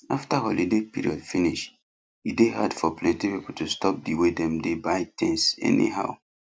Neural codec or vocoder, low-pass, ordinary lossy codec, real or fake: none; none; none; real